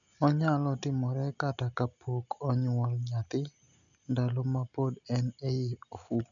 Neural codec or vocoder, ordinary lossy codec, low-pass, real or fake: none; none; 7.2 kHz; real